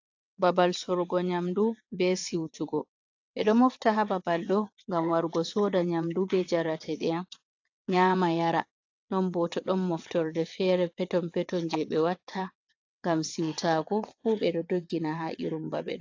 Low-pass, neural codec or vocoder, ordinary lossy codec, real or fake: 7.2 kHz; vocoder, 22.05 kHz, 80 mel bands, WaveNeXt; AAC, 48 kbps; fake